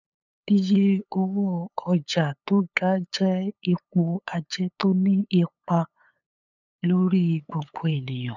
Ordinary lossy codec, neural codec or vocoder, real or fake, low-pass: none; codec, 16 kHz, 8 kbps, FunCodec, trained on LibriTTS, 25 frames a second; fake; 7.2 kHz